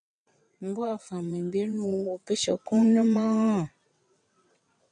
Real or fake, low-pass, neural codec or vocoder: fake; 9.9 kHz; vocoder, 22.05 kHz, 80 mel bands, WaveNeXt